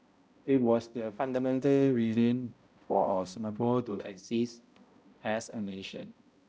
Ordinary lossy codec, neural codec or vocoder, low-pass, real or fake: none; codec, 16 kHz, 0.5 kbps, X-Codec, HuBERT features, trained on balanced general audio; none; fake